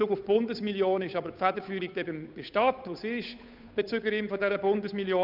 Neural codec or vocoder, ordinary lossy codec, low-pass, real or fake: codec, 16 kHz, 8 kbps, FunCodec, trained on Chinese and English, 25 frames a second; none; 5.4 kHz; fake